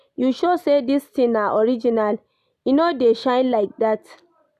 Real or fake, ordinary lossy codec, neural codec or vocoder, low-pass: real; none; none; 14.4 kHz